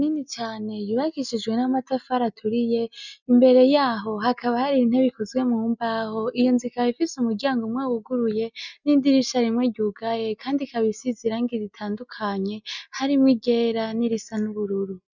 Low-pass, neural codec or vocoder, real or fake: 7.2 kHz; none; real